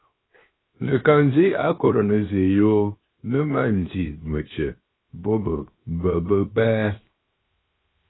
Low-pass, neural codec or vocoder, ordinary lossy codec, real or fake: 7.2 kHz; codec, 16 kHz, 0.7 kbps, FocalCodec; AAC, 16 kbps; fake